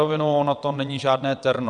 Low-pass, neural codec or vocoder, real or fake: 9.9 kHz; vocoder, 22.05 kHz, 80 mel bands, WaveNeXt; fake